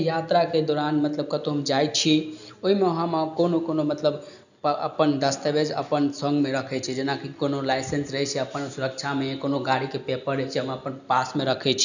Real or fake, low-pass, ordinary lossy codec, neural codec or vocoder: real; 7.2 kHz; none; none